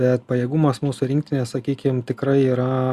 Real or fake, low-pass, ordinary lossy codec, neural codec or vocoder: real; 14.4 kHz; Opus, 64 kbps; none